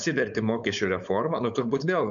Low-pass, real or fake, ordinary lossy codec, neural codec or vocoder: 7.2 kHz; fake; MP3, 96 kbps; codec, 16 kHz, 4.8 kbps, FACodec